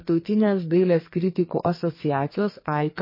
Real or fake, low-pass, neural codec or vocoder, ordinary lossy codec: fake; 5.4 kHz; codec, 44.1 kHz, 2.6 kbps, SNAC; MP3, 24 kbps